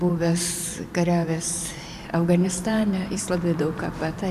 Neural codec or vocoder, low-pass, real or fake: vocoder, 44.1 kHz, 128 mel bands every 512 samples, BigVGAN v2; 14.4 kHz; fake